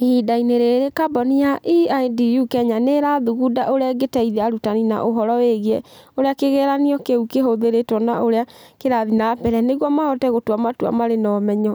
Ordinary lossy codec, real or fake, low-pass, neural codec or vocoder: none; real; none; none